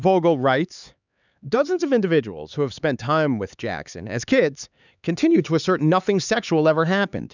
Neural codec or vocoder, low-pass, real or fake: codec, 16 kHz, 4 kbps, X-Codec, HuBERT features, trained on LibriSpeech; 7.2 kHz; fake